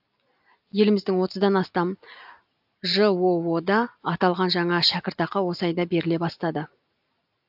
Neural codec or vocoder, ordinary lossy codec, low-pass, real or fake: none; AAC, 48 kbps; 5.4 kHz; real